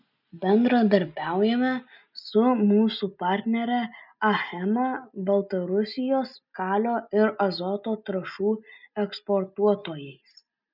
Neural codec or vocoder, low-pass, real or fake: none; 5.4 kHz; real